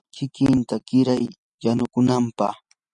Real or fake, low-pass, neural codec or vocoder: real; 10.8 kHz; none